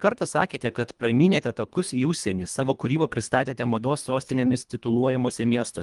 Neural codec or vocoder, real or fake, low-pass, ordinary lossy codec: codec, 24 kHz, 1.5 kbps, HILCodec; fake; 10.8 kHz; Opus, 32 kbps